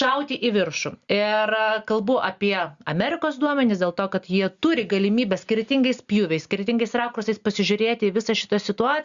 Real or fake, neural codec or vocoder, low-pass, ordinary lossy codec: real; none; 7.2 kHz; Opus, 64 kbps